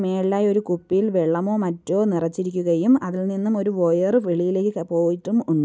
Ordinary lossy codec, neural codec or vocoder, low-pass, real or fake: none; none; none; real